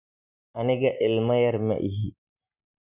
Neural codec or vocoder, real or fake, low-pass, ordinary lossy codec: none; real; 3.6 kHz; none